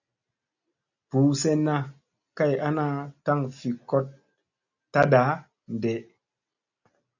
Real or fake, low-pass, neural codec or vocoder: real; 7.2 kHz; none